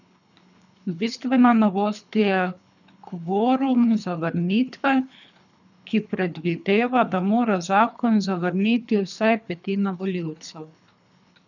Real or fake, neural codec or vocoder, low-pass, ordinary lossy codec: fake; codec, 24 kHz, 3 kbps, HILCodec; 7.2 kHz; none